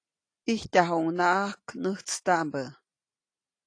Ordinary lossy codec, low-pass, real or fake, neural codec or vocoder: AAC, 48 kbps; 9.9 kHz; real; none